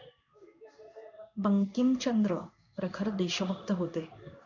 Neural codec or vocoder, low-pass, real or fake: codec, 16 kHz in and 24 kHz out, 1 kbps, XY-Tokenizer; 7.2 kHz; fake